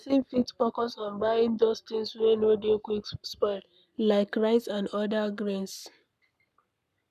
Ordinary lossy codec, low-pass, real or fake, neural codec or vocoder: none; 14.4 kHz; fake; codec, 44.1 kHz, 7.8 kbps, Pupu-Codec